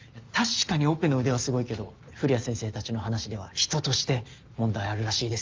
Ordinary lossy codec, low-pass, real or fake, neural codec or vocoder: Opus, 32 kbps; 7.2 kHz; real; none